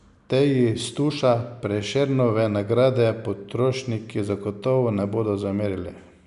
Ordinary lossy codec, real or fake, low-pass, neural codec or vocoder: none; real; 10.8 kHz; none